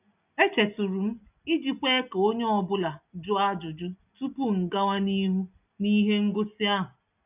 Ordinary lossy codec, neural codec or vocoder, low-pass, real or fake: none; none; 3.6 kHz; real